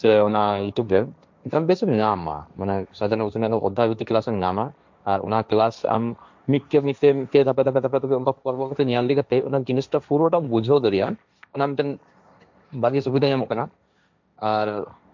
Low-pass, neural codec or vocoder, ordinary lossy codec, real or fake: 7.2 kHz; codec, 16 kHz, 1.1 kbps, Voila-Tokenizer; MP3, 64 kbps; fake